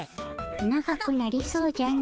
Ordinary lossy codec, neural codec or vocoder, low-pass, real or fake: none; codec, 16 kHz, 4 kbps, X-Codec, HuBERT features, trained on balanced general audio; none; fake